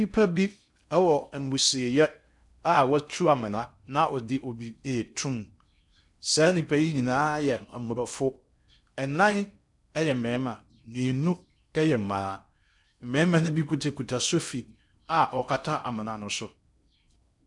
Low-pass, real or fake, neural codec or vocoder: 10.8 kHz; fake; codec, 16 kHz in and 24 kHz out, 0.6 kbps, FocalCodec, streaming, 2048 codes